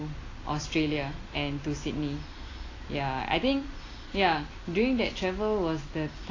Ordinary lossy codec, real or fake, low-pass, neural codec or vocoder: AAC, 32 kbps; real; 7.2 kHz; none